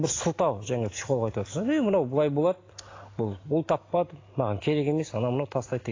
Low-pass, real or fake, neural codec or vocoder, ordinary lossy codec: 7.2 kHz; real; none; AAC, 32 kbps